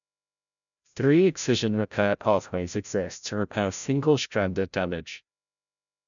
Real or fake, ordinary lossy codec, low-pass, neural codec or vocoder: fake; none; 7.2 kHz; codec, 16 kHz, 0.5 kbps, FreqCodec, larger model